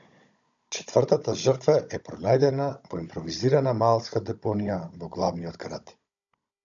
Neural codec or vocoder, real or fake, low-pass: codec, 16 kHz, 16 kbps, FunCodec, trained on Chinese and English, 50 frames a second; fake; 7.2 kHz